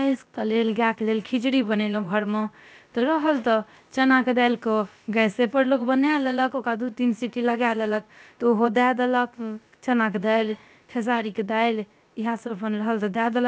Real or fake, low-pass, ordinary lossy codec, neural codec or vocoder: fake; none; none; codec, 16 kHz, about 1 kbps, DyCAST, with the encoder's durations